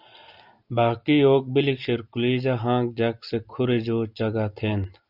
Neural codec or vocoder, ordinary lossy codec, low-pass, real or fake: none; Opus, 64 kbps; 5.4 kHz; real